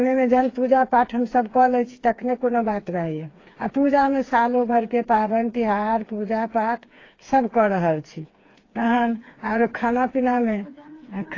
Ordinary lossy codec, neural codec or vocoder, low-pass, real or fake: AAC, 32 kbps; codec, 16 kHz, 4 kbps, FreqCodec, smaller model; 7.2 kHz; fake